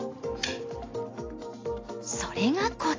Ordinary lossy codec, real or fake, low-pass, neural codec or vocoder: AAC, 32 kbps; real; 7.2 kHz; none